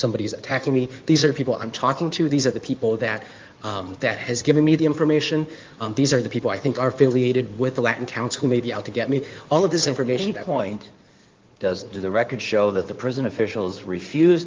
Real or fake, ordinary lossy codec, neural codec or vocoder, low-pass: fake; Opus, 24 kbps; codec, 16 kHz in and 24 kHz out, 2.2 kbps, FireRedTTS-2 codec; 7.2 kHz